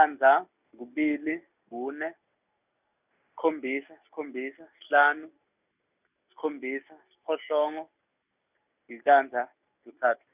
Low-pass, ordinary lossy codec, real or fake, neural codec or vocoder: 3.6 kHz; none; real; none